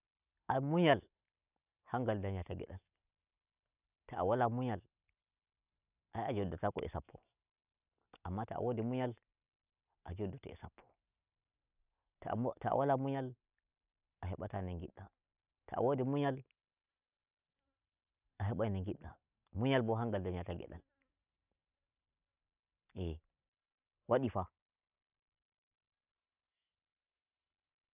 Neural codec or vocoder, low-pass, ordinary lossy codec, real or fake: none; 3.6 kHz; none; real